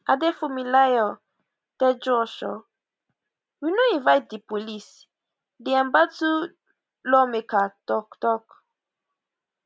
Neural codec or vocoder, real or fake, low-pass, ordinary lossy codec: none; real; none; none